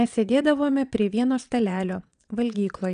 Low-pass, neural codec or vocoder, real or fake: 9.9 kHz; vocoder, 22.05 kHz, 80 mel bands, WaveNeXt; fake